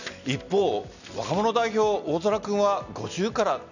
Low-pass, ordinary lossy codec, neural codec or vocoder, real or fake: 7.2 kHz; none; none; real